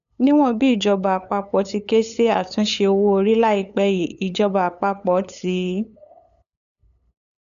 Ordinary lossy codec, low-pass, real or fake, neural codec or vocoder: none; 7.2 kHz; fake; codec, 16 kHz, 8 kbps, FunCodec, trained on LibriTTS, 25 frames a second